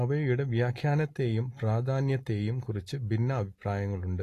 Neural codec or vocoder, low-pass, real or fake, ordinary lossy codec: none; 14.4 kHz; real; AAC, 48 kbps